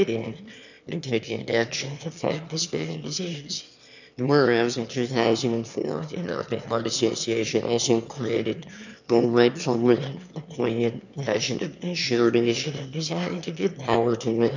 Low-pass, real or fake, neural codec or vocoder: 7.2 kHz; fake; autoencoder, 22.05 kHz, a latent of 192 numbers a frame, VITS, trained on one speaker